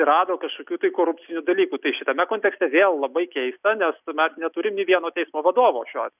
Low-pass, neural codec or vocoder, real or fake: 3.6 kHz; none; real